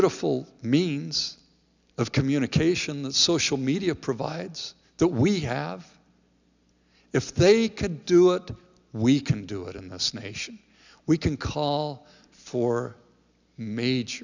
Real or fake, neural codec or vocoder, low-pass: real; none; 7.2 kHz